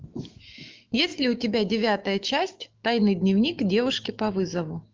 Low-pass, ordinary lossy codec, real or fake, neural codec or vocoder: 7.2 kHz; Opus, 24 kbps; fake; autoencoder, 48 kHz, 128 numbers a frame, DAC-VAE, trained on Japanese speech